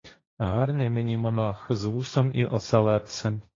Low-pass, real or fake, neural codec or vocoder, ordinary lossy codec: 7.2 kHz; fake; codec, 16 kHz, 1.1 kbps, Voila-Tokenizer; AAC, 32 kbps